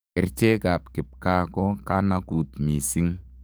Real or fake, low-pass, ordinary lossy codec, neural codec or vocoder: fake; none; none; codec, 44.1 kHz, 7.8 kbps, DAC